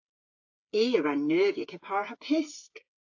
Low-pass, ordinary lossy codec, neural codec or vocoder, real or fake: 7.2 kHz; AAC, 48 kbps; codec, 16 kHz, 8 kbps, FreqCodec, smaller model; fake